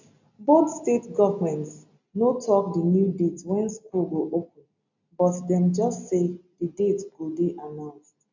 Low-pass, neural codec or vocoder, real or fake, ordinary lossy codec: 7.2 kHz; none; real; none